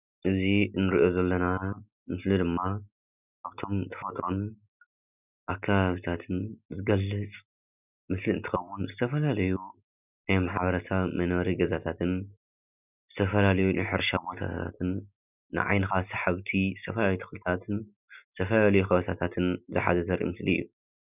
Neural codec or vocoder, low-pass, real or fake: none; 3.6 kHz; real